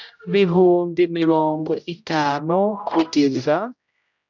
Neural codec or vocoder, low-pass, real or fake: codec, 16 kHz, 0.5 kbps, X-Codec, HuBERT features, trained on general audio; 7.2 kHz; fake